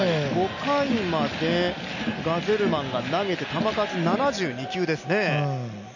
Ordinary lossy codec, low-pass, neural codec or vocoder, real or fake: none; 7.2 kHz; none; real